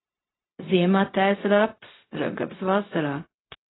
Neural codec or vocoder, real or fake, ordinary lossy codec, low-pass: codec, 16 kHz, 0.4 kbps, LongCat-Audio-Codec; fake; AAC, 16 kbps; 7.2 kHz